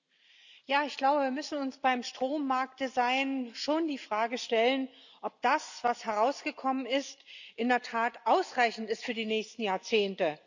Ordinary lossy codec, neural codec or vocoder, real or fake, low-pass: none; none; real; 7.2 kHz